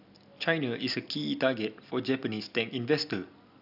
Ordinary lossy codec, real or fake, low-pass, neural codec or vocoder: none; real; 5.4 kHz; none